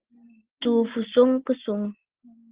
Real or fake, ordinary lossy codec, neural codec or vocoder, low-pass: real; Opus, 16 kbps; none; 3.6 kHz